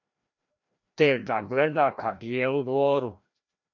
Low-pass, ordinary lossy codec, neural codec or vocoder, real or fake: 7.2 kHz; AAC, 48 kbps; codec, 16 kHz, 1 kbps, FreqCodec, larger model; fake